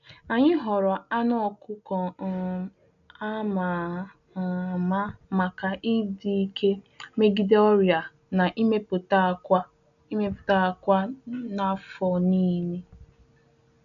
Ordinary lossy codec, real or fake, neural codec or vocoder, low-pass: none; real; none; 7.2 kHz